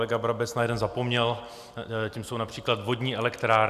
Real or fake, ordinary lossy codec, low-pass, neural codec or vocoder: real; MP3, 96 kbps; 14.4 kHz; none